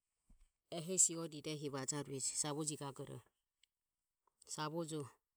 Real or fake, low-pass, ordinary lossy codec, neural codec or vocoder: real; none; none; none